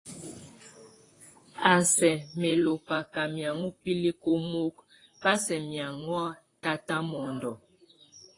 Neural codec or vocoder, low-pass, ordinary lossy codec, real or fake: vocoder, 44.1 kHz, 128 mel bands, Pupu-Vocoder; 10.8 kHz; AAC, 32 kbps; fake